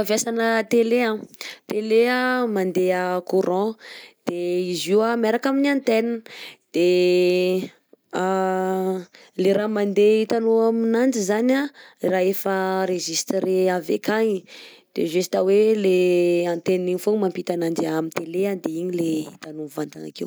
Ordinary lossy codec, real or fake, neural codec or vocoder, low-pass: none; real; none; none